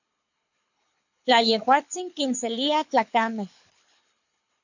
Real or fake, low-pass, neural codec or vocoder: fake; 7.2 kHz; codec, 24 kHz, 6 kbps, HILCodec